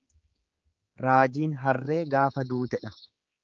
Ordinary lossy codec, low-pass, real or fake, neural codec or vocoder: Opus, 24 kbps; 7.2 kHz; fake; codec, 16 kHz, 4 kbps, X-Codec, HuBERT features, trained on general audio